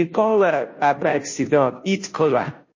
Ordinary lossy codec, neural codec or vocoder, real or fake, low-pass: MP3, 32 kbps; codec, 16 kHz, 0.5 kbps, FunCodec, trained on Chinese and English, 25 frames a second; fake; 7.2 kHz